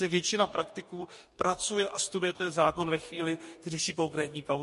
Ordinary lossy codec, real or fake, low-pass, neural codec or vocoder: MP3, 48 kbps; fake; 14.4 kHz; codec, 44.1 kHz, 2.6 kbps, DAC